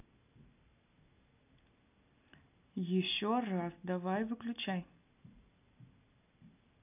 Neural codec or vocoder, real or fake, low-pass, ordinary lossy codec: none; real; 3.6 kHz; none